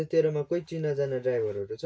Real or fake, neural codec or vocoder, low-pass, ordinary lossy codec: real; none; none; none